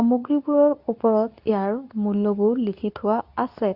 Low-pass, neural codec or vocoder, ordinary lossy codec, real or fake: 5.4 kHz; codec, 24 kHz, 0.9 kbps, WavTokenizer, medium speech release version 2; Opus, 64 kbps; fake